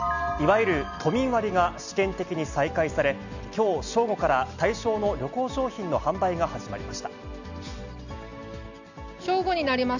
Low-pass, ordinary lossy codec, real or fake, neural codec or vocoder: 7.2 kHz; none; real; none